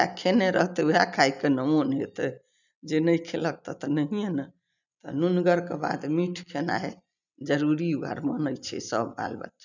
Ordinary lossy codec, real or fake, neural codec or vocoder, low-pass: none; real; none; 7.2 kHz